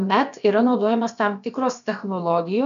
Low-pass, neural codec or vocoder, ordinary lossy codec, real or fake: 7.2 kHz; codec, 16 kHz, about 1 kbps, DyCAST, with the encoder's durations; MP3, 96 kbps; fake